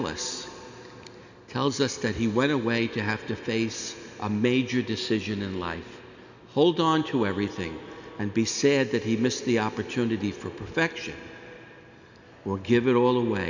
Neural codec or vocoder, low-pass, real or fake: none; 7.2 kHz; real